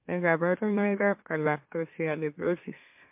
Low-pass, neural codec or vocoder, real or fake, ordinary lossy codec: 3.6 kHz; autoencoder, 44.1 kHz, a latent of 192 numbers a frame, MeloTTS; fake; MP3, 32 kbps